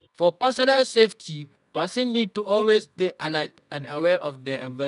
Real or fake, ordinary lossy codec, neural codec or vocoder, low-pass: fake; none; codec, 24 kHz, 0.9 kbps, WavTokenizer, medium music audio release; 10.8 kHz